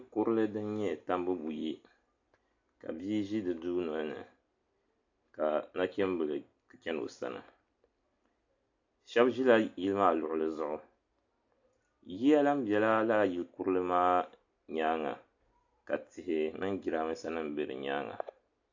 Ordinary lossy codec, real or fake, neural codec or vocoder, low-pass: AAC, 48 kbps; real; none; 7.2 kHz